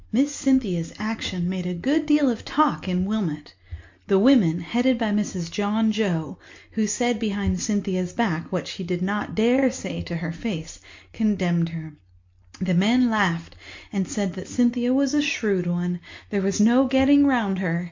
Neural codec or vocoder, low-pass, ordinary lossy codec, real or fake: none; 7.2 kHz; MP3, 48 kbps; real